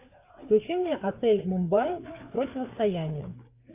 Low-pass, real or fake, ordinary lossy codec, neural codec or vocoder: 3.6 kHz; fake; AAC, 24 kbps; codec, 16 kHz, 4 kbps, FreqCodec, larger model